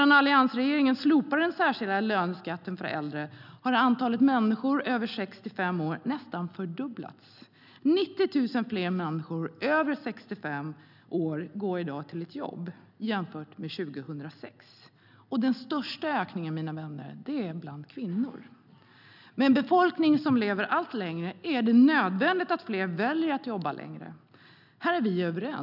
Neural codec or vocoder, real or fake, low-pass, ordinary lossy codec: none; real; 5.4 kHz; none